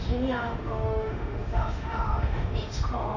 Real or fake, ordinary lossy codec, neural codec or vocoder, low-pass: fake; none; autoencoder, 48 kHz, 32 numbers a frame, DAC-VAE, trained on Japanese speech; 7.2 kHz